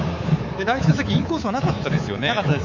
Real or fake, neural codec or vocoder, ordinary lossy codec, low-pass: fake; codec, 24 kHz, 3.1 kbps, DualCodec; none; 7.2 kHz